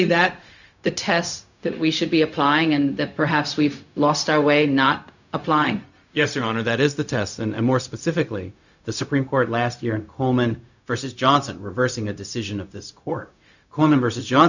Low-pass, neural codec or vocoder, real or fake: 7.2 kHz; codec, 16 kHz, 0.4 kbps, LongCat-Audio-Codec; fake